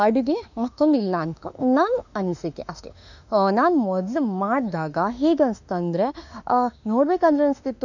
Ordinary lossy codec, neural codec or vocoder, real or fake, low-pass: none; autoencoder, 48 kHz, 32 numbers a frame, DAC-VAE, trained on Japanese speech; fake; 7.2 kHz